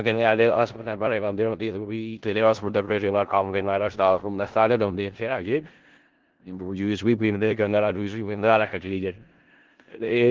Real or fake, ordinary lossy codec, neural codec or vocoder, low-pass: fake; Opus, 16 kbps; codec, 16 kHz in and 24 kHz out, 0.4 kbps, LongCat-Audio-Codec, four codebook decoder; 7.2 kHz